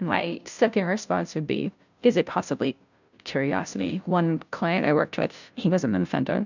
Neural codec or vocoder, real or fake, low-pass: codec, 16 kHz, 0.5 kbps, FunCodec, trained on Chinese and English, 25 frames a second; fake; 7.2 kHz